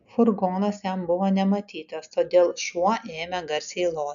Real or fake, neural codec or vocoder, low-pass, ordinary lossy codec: real; none; 7.2 kHz; MP3, 96 kbps